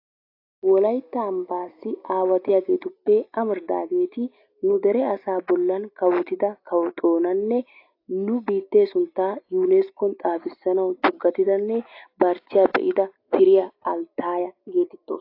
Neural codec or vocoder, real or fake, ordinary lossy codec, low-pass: none; real; AAC, 32 kbps; 5.4 kHz